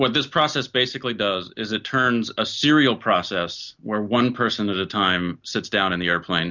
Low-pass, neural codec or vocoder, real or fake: 7.2 kHz; none; real